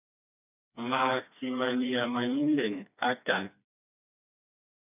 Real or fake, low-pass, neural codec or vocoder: fake; 3.6 kHz; codec, 16 kHz, 2 kbps, FreqCodec, smaller model